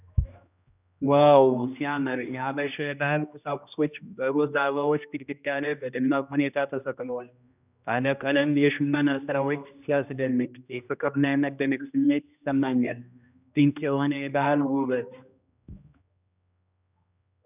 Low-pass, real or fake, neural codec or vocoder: 3.6 kHz; fake; codec, 16 kHz, 1 kbps, X-Codec, HuBERT features, trained on general audio